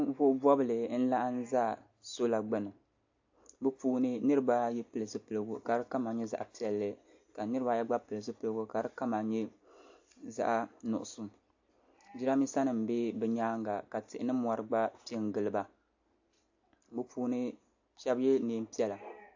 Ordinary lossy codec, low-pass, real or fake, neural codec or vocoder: AAC, 48 kbps; 7.2 kHz; real; none